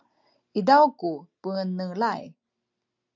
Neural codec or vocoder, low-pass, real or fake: none; 7.2 kHz; real